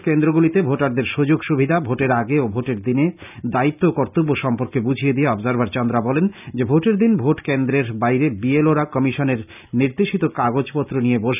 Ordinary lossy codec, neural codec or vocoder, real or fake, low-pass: none; none; real; 3.6 kHz